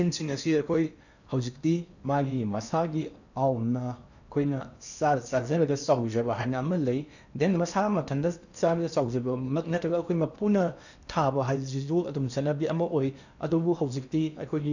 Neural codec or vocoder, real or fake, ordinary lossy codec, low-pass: codec, 16 kHz in and 24 kHz out, 0.8 kbps, FocalCodec, streaming, 65536 codes; fake; none; 7.2 kHz